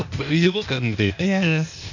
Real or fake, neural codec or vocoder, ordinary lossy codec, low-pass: fake; codec, 16 kHz, 0.8 kbps, ZipCodec; none; 7.2 kHz